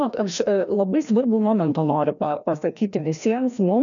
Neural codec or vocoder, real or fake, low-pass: codec, 16 kHz, 1 kbps, FreqCodec, larger model; fake; 7.2 kHz